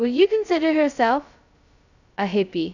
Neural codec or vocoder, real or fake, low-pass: codec, 16 kHz, 0.2 kbps, FocalCodec; fake; 7.2 kHz